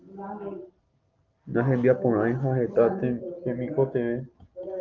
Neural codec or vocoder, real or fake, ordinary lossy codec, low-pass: none; real; Opus, 24 kbps; 7.2 kHz